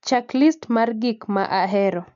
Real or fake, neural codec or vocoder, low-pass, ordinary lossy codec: real; none; 7.2 kHz; MP3, 64 kbps